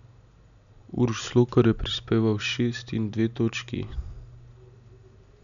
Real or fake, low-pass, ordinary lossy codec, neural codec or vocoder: real; 7.2 kHz; none; none